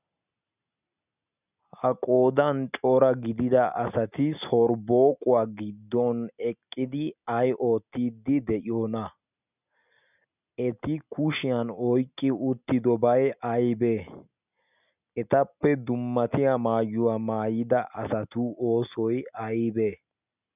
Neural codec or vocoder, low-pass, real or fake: none; 3.6 kHz; real